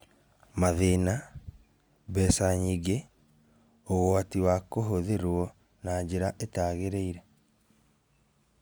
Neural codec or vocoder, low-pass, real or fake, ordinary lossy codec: none; none; real; none